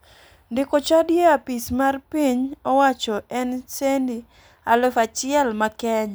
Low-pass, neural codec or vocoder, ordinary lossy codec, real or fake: none; none; none; real